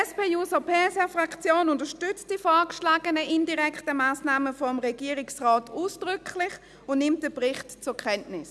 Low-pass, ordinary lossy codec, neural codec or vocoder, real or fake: none; none; none; real